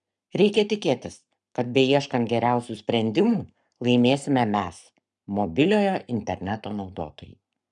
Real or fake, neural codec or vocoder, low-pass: fake; codec, 44.1 kHz, 7.8 kbps, Pupu-Codec; 10.8 kHz